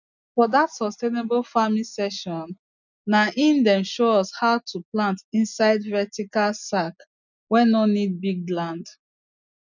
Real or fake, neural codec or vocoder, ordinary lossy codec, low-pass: real; none; none; 7.2 kHz